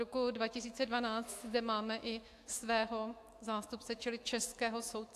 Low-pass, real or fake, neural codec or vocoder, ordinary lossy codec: 14.4 kHz; fake; autoencoder, 48 kHz, 128 numbers a frame, DAC-VAE, trained on Japanese speech; AAC, 64 kbps